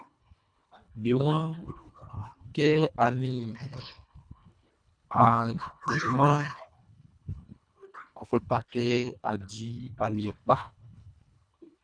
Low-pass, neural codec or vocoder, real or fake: 9.9 kHz; codec, 24 kHz, 1.5 kbps, HILCodec; fake